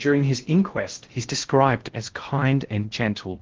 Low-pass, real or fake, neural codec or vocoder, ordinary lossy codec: 7.2 kHz; fake; codec, 16 kHz in and 24 kHz out, 0.6 kbps, FocalCodec, streaming, 2048 codes; Opus, 16 kbps